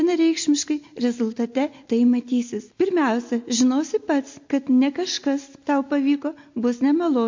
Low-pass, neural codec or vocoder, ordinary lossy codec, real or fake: 7.2 kHz; none; MP3, 48 kbps; real